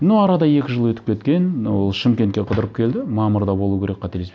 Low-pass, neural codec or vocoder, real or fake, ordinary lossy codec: none; none; real; none